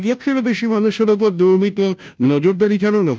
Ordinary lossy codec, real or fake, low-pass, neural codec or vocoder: none; fake; none; codec, 16 kHz, 0.5 kbps, FunCodec, trained on Chinese and English, 25 frames a second